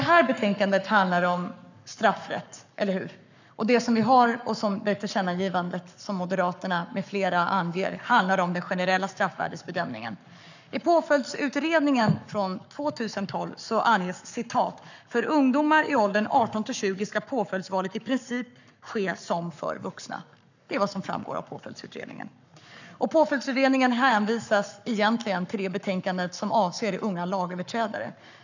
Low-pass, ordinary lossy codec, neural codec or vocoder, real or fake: 7.2 kHz; none; codec, 44.1 kHz, 7.8 kbps, Pupu-Codec; fake